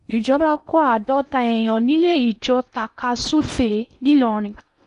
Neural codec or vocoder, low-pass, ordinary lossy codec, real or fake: codec, 16 kHz in and 24 kHz out, 0.8 kbps, FocalCodec, streaming, 65536 codes; 10.8 kHz; none; fake